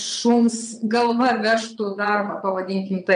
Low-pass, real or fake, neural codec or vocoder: 9.9 kHz; fake; vocoder, 22.05 kHz, 80 mel bands, WaveNeXt